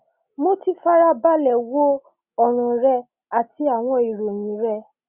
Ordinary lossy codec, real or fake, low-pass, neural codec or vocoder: Opus, 64 kbps; real; 3.6 kHz; none